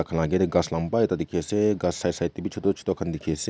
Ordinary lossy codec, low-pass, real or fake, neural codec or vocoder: none; none; real; none